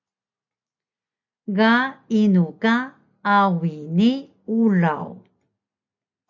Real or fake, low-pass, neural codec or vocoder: real; 7.2 kHz; none